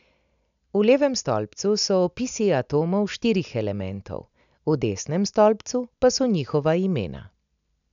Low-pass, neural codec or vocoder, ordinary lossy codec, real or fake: 7.2 kHz; none; none; real